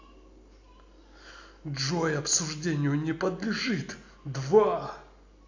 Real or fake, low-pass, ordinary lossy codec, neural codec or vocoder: real; 7.2 kHz; AAC, 48 kbps; none